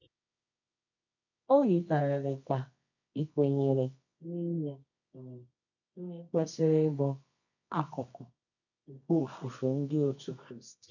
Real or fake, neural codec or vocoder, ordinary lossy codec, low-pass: fake; codec, 24 kHz, 0.9 kbps, WavTokenizer, medium music audio release; none; 7.2 kHz